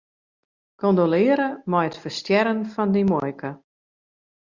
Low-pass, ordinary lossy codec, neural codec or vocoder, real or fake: 7.2 kHz; Opus, 64 kbps; none; real